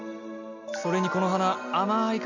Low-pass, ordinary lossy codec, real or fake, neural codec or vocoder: 7.2 kHz; none; real; none